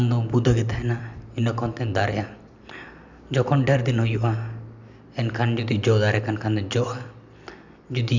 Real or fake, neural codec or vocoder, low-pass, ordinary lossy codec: real; none; 7.2 kHz; none